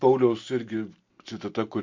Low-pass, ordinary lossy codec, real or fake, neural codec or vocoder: 7.2 kHz; MP3, 48 kbps; real; none